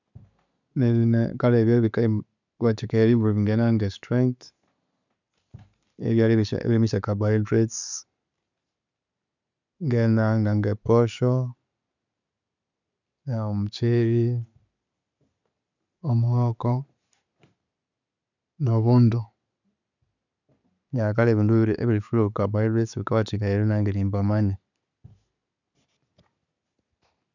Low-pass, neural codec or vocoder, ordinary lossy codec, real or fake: 7.2 kHz; none; none; real